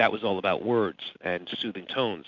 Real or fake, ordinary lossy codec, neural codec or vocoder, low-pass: real; MP3, 64 kbps; none; 7.2 kHz